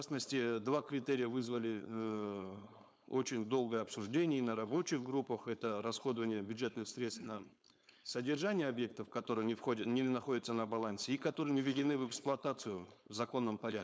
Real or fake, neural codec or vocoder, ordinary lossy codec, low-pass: fake; codec, 16 kHz, 4.8 kbps, FACodec; none; none